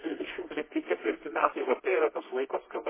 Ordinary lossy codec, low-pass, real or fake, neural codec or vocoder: MP3, 16 kbps; 3.6 kHz; fake; codec, 24 kHz, 0.9 kbps, WavTokenizer, medium music audio release